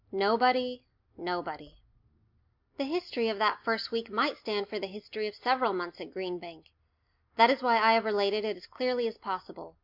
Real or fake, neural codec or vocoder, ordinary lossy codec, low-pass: real; none; MP3, 48 kbps; 5.4 kHz